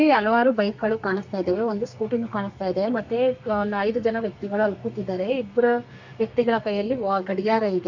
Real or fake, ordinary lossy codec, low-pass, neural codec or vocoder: fake; none; 7.2 kHz; codec, 32 kHz, 1.9 kbps, SNAC